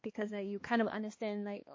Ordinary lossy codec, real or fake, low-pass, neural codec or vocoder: MP3, 32 kbps; fake; 7.2 kHz; codec, 16 kHz, 2 kbps, X-Codec, HuBERT features, trained on balanced general audio